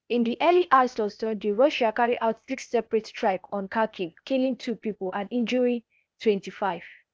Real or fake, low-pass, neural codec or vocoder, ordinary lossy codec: fake; none; codec, 16 kHz, 0.8 kbps, ZipCodec; none